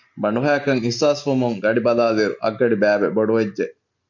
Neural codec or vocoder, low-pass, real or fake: none; 7.2 kHz; real